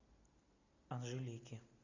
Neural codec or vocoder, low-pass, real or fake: none; 7.2 kHz; real